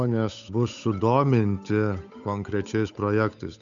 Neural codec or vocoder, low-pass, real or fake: codec, 16 kHz, 8 kbps, FunCodec, trained on Chinese and English, 25 frames a second; 7.2 kHz; fake